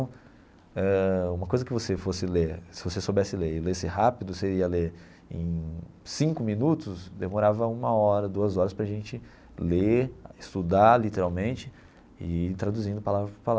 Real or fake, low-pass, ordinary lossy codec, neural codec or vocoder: real; none; none; none